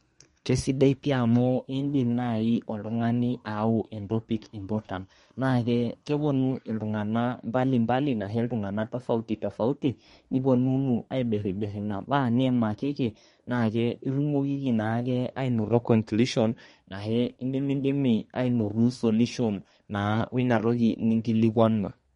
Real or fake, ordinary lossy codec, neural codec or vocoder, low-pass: fake; MP3, 48 kbps; codec, 24 kHz, 1 kbps, SNAC; 10.8 kHz